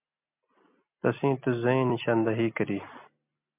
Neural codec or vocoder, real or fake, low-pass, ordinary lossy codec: none; real; 3.6 kHz; MP3, 32 kbps